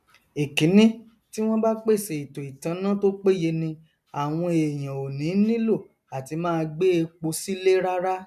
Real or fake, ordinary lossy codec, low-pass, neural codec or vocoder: real; none; 14.4 kHz; none